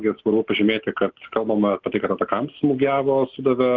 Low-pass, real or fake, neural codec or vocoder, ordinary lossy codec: 7.2 kHz; real; none; Opus, 32 kbps